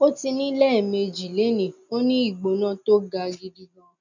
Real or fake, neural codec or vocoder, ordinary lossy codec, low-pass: real; none; none; 7.2 kHz